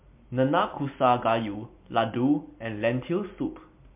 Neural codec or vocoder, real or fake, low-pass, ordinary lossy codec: none; real; 3.6 kHz; MP3, 32 kbps